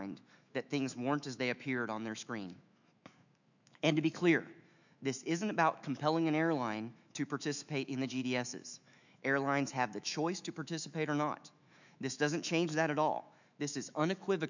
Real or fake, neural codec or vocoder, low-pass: fake; autoencoder, 48 kHz, 128 numbers a frame, DAC-VAE, trained on Japanese speech; 7.2 kHz